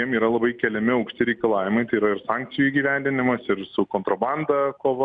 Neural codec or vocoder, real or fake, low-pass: none; real; 9.9 kHz